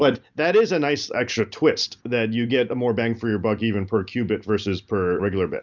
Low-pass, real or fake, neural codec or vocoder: 7.2 kHz; real; none